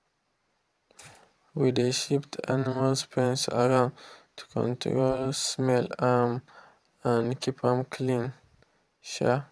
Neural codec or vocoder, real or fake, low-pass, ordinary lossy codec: vocoder, 22.05 kHz, 80 mel bands, Vocos; fake; none; none